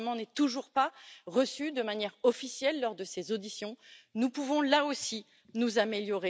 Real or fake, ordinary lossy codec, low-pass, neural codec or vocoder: real; none; none; none